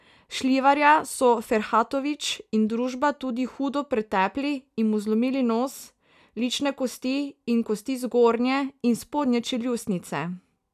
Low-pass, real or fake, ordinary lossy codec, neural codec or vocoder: 14.4 kHz; real; none; none